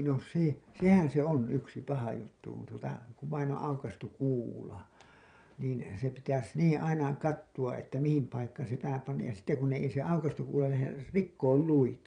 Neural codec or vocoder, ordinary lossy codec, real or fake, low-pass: vocoder, 22.05 kHz, 80 mel bands, Vocos; none; fake; 9.9 kHz